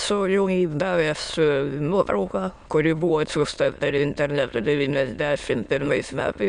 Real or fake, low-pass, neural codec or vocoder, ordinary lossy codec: fake; 9.9 kHz; autoencoder, 22.05 kHz, a latent of 192 numbers a frame, VITS, trained on many speakers; MP3, 96 kbps